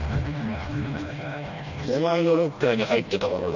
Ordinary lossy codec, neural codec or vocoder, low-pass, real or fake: none; codec, 16 kHz, 1 kbps, FreqCodec, smaller model; 7.2 kHz; fake